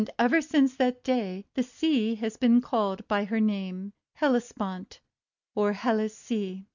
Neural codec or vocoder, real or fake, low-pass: none; real; 7.2 kHz